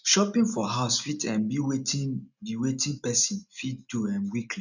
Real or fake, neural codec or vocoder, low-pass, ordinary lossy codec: real; none; 7.2 kHz; none